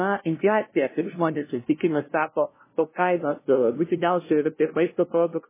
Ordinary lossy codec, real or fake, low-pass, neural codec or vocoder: MP3, 16 kbps; fake; 3.6 kHz; codec, 16 kHz, 0.5 kbps, FunCodec, trained on LibriTTS, 25 frames a second